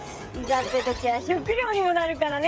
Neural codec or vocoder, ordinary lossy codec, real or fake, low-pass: codec, 16 kHz, 8 kbps, FreqCodec, smaller model; none; fake; none